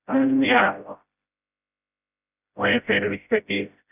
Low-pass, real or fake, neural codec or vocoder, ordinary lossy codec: 3.6 kHz; fake; codec, 16 kHz, 0.5 kbps, FreqCodec, smaller model; none